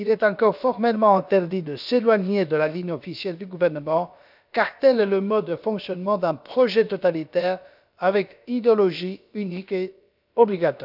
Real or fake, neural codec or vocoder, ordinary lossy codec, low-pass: fake; codec, 16 kHz, about 1 kbps, DyCAST, with the encoder's durations; none; 5.4 kHz